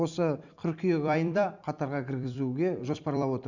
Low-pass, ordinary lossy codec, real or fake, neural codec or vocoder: 7.2 kHz; none; fake; vocoder, 44.1 kHz, 128 mel bands every 256 samples, BigVGAN v2